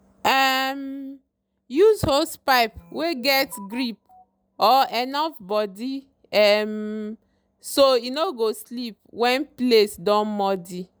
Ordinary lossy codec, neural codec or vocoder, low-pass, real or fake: none; none; none; real